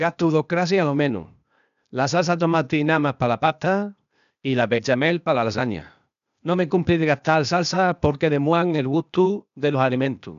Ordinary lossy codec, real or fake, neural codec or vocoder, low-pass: none; fake; codec, 16 kHz, 0.8 kbps, ZipCodec; 7.2 kHz